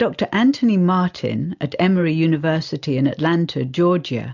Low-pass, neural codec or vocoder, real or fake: 7.2 kHz; none; real